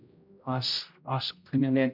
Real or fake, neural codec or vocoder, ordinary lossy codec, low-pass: fake; codec, 16 kHz, 0.5 kbps, X-Codec, HuBERT features, trained on general audio; MP3, 48 kbps; 5.4 kHz